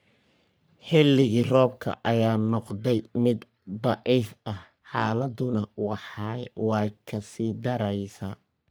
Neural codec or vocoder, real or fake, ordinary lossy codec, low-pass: codec, 44.1 kHz, 3.4 kbps, Pupu-Codec; fake; none; none